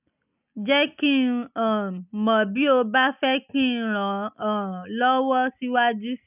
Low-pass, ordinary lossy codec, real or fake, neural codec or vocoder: 3.6 kHz; none; real; none